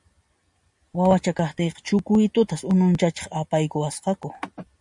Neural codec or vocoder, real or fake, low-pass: none; real; 10.8 kHz